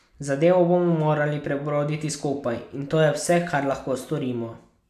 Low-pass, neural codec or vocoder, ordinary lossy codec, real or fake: 14.4 kHz; none; none; real